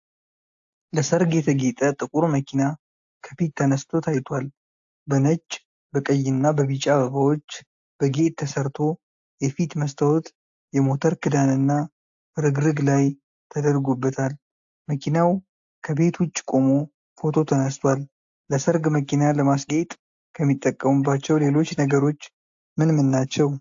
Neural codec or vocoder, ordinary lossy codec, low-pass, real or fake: none; AAC, 64 kbps; 7.2 kHz; real